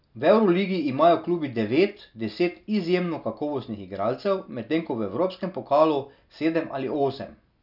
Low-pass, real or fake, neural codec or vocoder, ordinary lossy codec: 5.4 kHz; real; none; none